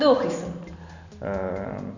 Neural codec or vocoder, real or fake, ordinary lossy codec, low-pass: none; real; none; 7.2 kHz